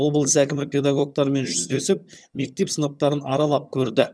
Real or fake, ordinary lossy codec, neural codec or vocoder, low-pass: fake; none; vocoder, 22.05 kHz, 80 mel bands, HiFi-GAN; none